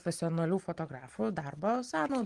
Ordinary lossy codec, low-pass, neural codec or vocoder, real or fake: Opus, 24 kbps; 10.8 kHz; none; real